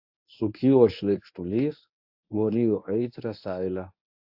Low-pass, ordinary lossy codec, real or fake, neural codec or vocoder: 5.4 kHz; AAC, 48 kbps; fake; codec, 24 kHz, 0.9 kbps, WavTokenizer, medium speech release version 1